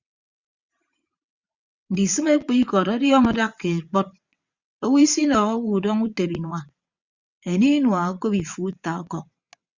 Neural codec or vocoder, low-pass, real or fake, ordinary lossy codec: vocoder, 22.05 kHz, 80 mel bands, WaveNeXt; 7.2 kHz; fake; Opus, 64 kbps